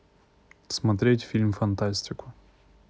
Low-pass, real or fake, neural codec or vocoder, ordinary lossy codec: none; real; none; none